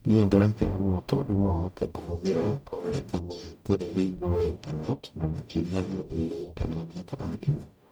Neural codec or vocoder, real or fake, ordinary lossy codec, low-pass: codec, 44.1 kHz, 0.9 kbps, DAC; fake; none; none